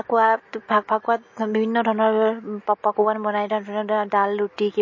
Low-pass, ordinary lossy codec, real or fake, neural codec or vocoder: 7.2 kHz; MP3, 32 kbps; real; none